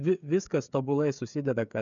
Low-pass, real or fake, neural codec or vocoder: 7.2 kHz; fake; codec, 16 kHz, 8 kbps, FreqCodec, smaller model